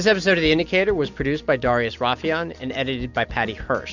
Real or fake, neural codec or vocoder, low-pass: real; none; 7.2 kHz